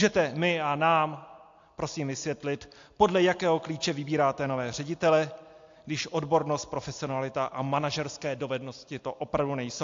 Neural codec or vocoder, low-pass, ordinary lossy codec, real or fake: none; 7.2 kHz; AAC, 48 kbps; real